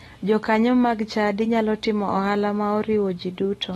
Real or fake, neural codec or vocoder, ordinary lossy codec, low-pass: real; none; AAC, 32 kbps; 19.8 kHz